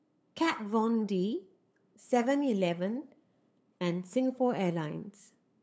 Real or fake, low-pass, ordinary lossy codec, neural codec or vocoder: fake; none; none; codec, 16 kHz, 8 kbps, FunCodec, trained on LibriTTS, 25 frames a second